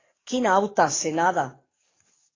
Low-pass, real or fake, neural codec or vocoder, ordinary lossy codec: 7.2 kHz; fake; codec, 44.1 kHz, 7.8 kbps, DAC; AAC, 32 kbps